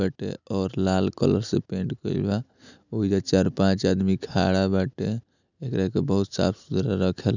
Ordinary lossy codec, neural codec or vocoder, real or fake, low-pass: none; none; real; 7.2 kHz